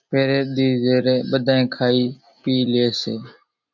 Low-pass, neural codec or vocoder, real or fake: 7.2 kHz; none; real